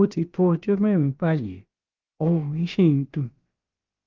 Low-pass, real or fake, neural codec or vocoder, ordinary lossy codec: 7.2 kHz; fake; codec, 16 kHz, about 1 kbps, DyCAST, with the encoder's durations; Opus, 32 kbps